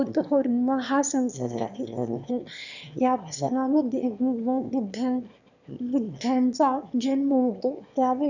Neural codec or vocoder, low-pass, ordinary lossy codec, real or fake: autoencoder, 22.05 kHz, a latent of 192 numbers a frame, VITS, trained on one speaker; 7.2 kHz; none; fake